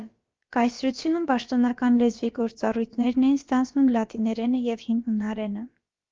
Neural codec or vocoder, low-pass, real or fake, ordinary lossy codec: codec, 16 kHz, about 1 kbps, DyCAST, with the encoder's durations; 7.2 kHz; fake; Opus, 32 kbps